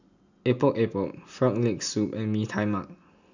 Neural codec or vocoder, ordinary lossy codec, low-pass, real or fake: none; none; 7.2 kHz; real